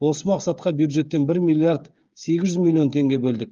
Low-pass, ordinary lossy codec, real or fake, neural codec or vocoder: 7.2 kHz; Opus, 32 kbps; fake; codec, 16 kHz, 8 kbps, FreqCodec, smaller model